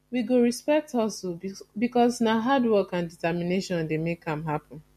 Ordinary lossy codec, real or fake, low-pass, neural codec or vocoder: MP3, 64 kbps; real; 14.4 kHz; none